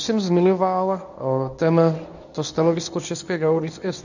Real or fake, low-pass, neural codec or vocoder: fake; 7.2 kHz; codec, 24 kHz, 0.9 kbps, WavTokenizer, medium speech release version 1